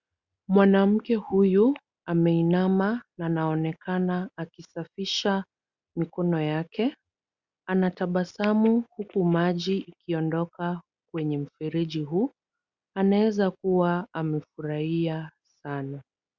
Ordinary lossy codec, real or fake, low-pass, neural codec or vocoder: Opus, 64 kbps; real; 7.2 kHz; none